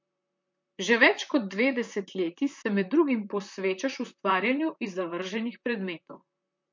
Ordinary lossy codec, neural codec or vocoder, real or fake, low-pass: MP3, 48 kbps; vocoder, 44.1 kHz, 128 mel bands, Pupu-Vocoder; fake; 7.2 kHz